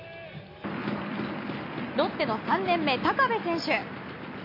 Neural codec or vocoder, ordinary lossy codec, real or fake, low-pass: none; MP3, 32 kbps; real; 5.4 kHz